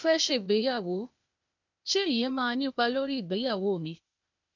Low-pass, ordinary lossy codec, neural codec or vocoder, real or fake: 7.2 kHz; none; codec, 16 kHz, 0.8 kbps, ZipCodec; fake